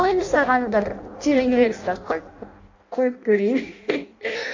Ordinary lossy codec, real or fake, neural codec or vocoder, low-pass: AAC, 48 kbps; fake; codec, 16 kHz in and 24 kHz out, 0.6 kbps, FireRedTTS-2 codec; 7.2 kHz